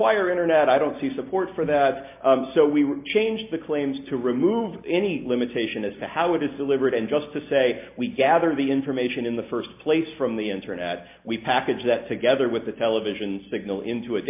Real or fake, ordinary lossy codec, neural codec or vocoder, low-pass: real; MP3, 32 kbps; none; 3.6 kHz